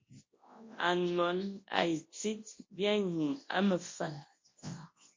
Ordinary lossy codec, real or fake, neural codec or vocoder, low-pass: MP3, 32 kbps; fake; codec, 24 kHz, 0.9 kbps, WavTokenizer, large speech release; 7.2 kHz